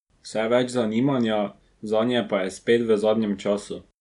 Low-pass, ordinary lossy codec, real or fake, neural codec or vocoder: 10.8 kHz; MP3, 96 kbps; real; none